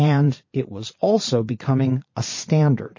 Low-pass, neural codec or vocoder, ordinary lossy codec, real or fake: 7.2 kHz; vocoder, 22.05 kHz, 80 mel bands, Vocos; MP3, 32 kbps; fake